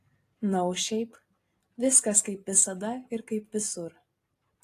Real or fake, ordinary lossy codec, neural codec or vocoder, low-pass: real; AAC, 48 kbps; none; 14.4 kHz